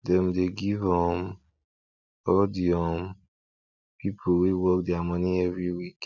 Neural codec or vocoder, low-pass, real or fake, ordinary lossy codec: none; 7.2 kHz; real; none